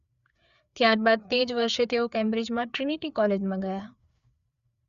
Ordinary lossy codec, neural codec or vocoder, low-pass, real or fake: Opus, 64 kbps; codec, 16 kHz, 4 kbps, FreqCodec, larger model; 7.2 kHz; fake